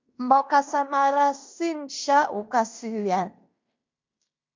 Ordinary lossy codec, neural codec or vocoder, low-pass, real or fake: MP3, 64 kbps; codec, 16 kHz in and 24 kHz out, 0.9 kbps, LongCat-Audio-Codec, fine tuned four codebook decoder; 7.2 kHz; fake